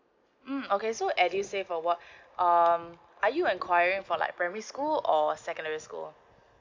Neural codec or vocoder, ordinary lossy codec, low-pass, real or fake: none; MP3, 64 kbps; 7.2 kHz; real